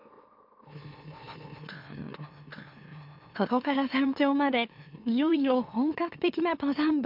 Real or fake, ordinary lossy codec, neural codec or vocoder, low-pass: fake; MP3, 48 kbps; autoencoder, 44.1 kHz, a latent of 192 numbers a frame, MeloTTS; 5.4 kHz